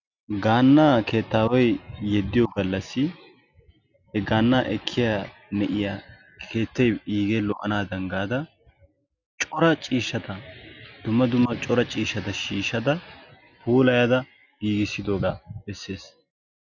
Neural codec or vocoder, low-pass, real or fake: none; 7.2 kHz; real